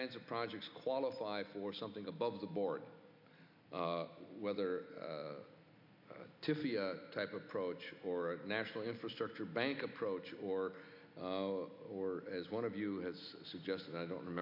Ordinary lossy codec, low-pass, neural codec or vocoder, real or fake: MP3, 48 kbps; 5.4 kHz; none; real